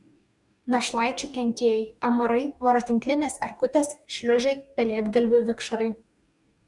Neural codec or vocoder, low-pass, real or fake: codec, 44.1 kHz, 2.6 kbps, DAC; 10.8 kHz; fake